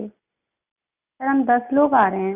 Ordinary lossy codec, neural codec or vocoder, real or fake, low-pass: none; none; real; 3.6 kHz